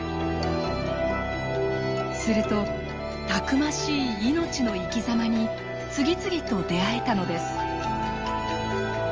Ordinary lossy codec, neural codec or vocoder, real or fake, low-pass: Opus, 24 kbps; none; real; 7.2 kHz